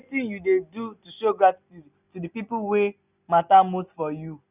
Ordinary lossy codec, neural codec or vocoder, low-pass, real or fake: none; none; 3.6 kHz; real